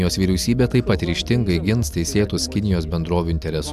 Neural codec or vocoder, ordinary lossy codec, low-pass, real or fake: none; AAC, 96 kbps; 14.4 kHz; real